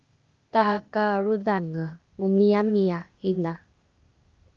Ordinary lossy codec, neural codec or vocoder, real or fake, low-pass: Opus, 32 kbps; codec, 16 kHz, 0.8 kbps, ZipCodec; fake; 7.2 kHz